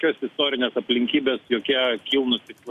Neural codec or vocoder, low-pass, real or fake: none; 14.4 kHz; real